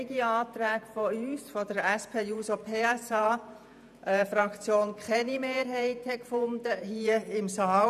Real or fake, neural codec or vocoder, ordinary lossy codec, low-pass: fake; vocoder, 48 kHz, 128 mel bands, Vocos; none; 14.4 kHz